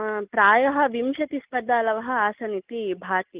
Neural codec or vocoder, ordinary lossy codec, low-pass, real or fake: none; Opus, 32 kbps; 3.6 kHz; real